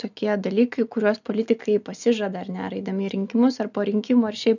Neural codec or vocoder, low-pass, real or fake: none; 7.2 kHz; real